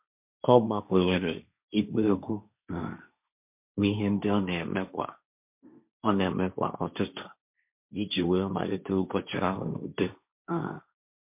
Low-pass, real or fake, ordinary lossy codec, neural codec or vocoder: 3.6 kHz; fake; MP3, 32 kbps; codec, 16 kHz, 1.1 kbps, Voila-Tokenizer